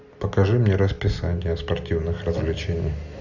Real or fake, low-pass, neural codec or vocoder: real; 7.2 kHz; none